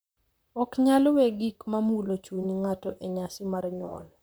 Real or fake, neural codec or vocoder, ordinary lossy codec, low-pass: fake; vocoder, 44.1 kHz, 128 mel bands, Pupu-Vocoder; none; none